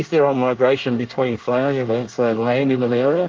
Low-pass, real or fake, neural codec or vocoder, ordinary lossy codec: 7.2 kHz; fake; codec, 24 kHz, 1 kbps, SNAC; Opus, 32 kbps